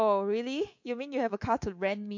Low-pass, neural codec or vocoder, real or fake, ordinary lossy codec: 7.2 kHz; codec, 24 kHz, 3.1 kbps, DualCodec; fake; MP3, 48 kbps